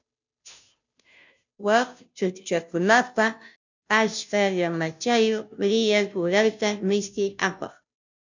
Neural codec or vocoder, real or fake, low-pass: codec, 16 kHz, 0.5 kbps, FunCodec, trained on Chinese and English, 25 frames a second; fake; 7.2 kHz